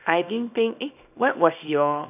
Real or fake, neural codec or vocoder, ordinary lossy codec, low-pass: fake; codec, 24 kHz, 0.9 kbps, WavTokenizer, small release; none; 3.6 kHz